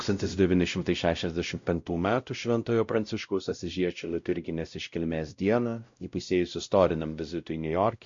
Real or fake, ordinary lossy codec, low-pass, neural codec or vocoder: fake; MP3, 48 kbps; 7.2 kHz; codec, 16 kHz, 0.5 kbps, X-Codec, WavLM features, trained on Multilingual LibriSpeech